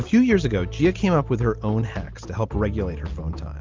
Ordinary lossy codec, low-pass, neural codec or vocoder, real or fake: Opus, 32 kbps; 7.2 kHz; none; real